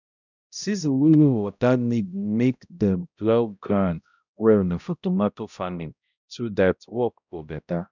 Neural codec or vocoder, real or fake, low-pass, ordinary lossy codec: codec, 16 kHz, 0.5 kbps, X-Codec, HuBERT features, trained on balanced general audio; fake; 7.2 kHz; none